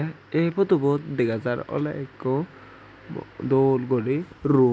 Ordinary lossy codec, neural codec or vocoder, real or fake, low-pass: none; none; real; none